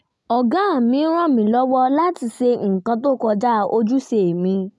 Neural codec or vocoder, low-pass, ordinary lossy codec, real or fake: none; none; none; real